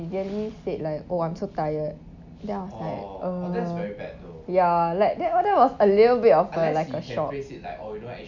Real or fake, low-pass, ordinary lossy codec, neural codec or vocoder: real; 7.2 kHz; none; none